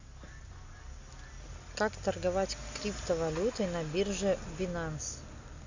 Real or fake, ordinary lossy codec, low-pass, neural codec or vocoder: real; Opus, 64 kbps; 7.2 kHz; none